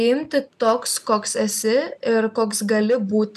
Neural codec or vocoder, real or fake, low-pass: none; real; 14.4 kHz